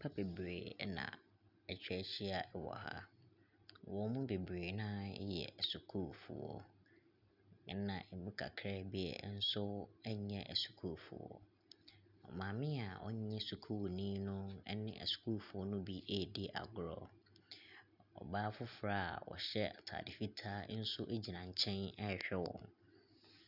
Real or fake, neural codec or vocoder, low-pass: real; none; 5.4 kHz